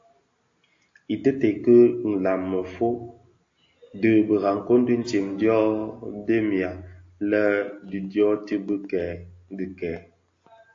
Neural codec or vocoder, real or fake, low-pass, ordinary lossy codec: none; real; 7.2 kHz; AAC, 48 kbps